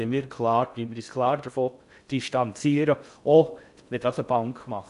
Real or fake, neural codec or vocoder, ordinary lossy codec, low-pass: fake; codec, 16 kHz in and 24 kHz out, 0.6 kbps, FocalCodec, streaming, 2048 codes; none; 10.8 kHz